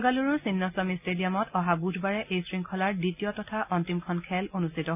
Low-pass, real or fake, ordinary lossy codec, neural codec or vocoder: 3.6 kHz; real; none; none